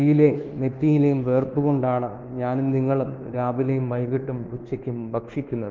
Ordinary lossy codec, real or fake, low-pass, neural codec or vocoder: Opus, 16 kbps; fake; 7.2 kHz; codec, 24 kHz, 1.2 kbps, DualCodec